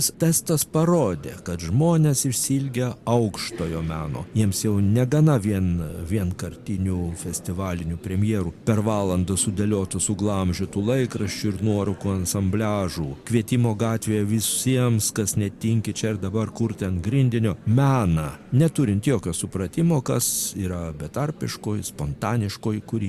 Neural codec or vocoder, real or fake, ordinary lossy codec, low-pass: none; real; Opus, 64 kbps; 14.4 kHz